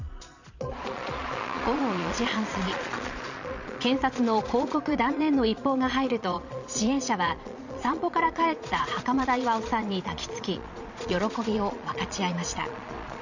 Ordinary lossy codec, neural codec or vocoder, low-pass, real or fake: none; vocoder, 22.05 kHz, 80 mel bands, Vocos; 7.2 kHz; fake